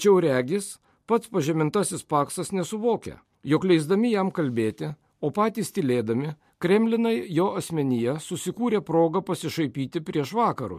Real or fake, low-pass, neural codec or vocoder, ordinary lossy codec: real; 14.4 kHz; none; MP3, 64 kbps